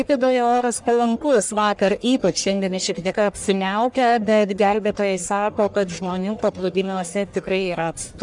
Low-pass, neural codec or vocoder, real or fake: 10.8 kHz; codec, 44.1 kHz, 1.7 kbps, Pupu-Codec; fake